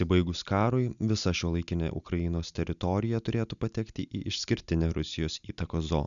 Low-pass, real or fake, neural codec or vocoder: 7.2 kHz; real; none